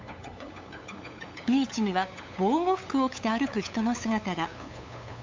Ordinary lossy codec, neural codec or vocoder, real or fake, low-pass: MP3, 64 kbps; codec, 16 kHz, 8 kbps, FunCodec, trained on LibriTTS, 25 frames a second; fake; 7.2 kHz